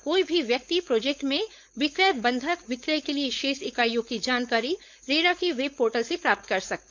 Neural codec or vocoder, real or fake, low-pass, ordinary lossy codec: codec, 16 kHz, 4.8 kbps, FACodec; fake; none; none